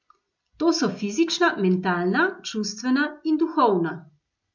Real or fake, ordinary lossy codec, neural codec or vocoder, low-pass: real; MP3, 64 kbps; none; 7.2 kHz